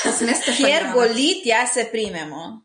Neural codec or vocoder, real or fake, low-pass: none; real; 9.9 kHz